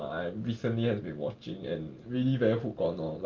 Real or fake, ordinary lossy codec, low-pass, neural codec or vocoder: real; Opus, 24 kbps; 7.2 kHz; none